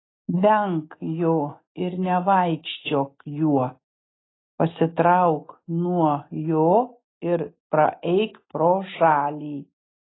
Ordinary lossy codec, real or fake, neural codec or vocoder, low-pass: AAC, 16 kbps; real; none; 7.2 kHz